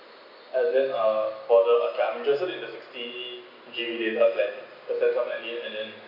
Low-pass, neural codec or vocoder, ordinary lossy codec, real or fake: 5.4 kHz; none; none; real